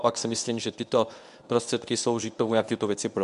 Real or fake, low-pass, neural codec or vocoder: fake; 10.8 kHz; codec, 24 kHz, 0.9 kbps, WavTokenizer, medium speech release version 1